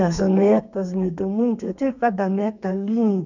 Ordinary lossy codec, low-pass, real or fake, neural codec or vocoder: none; 7.2 kHz; fake; codec, 32 kHz, 1.9 kbps, SNAC